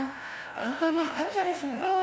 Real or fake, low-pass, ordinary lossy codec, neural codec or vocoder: fake; none; none; codec, 16 kHz, 0.5 kbps, FunCodec, trained on LibriTTS, 25 frames a second